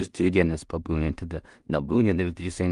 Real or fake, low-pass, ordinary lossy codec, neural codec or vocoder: fake; 10.8 kHz; Opus, 32 kbps; codec, 16 kHz in and 24 kHz out, 0.4 kbps, LongCat-Audio-Codec, two codebook decoder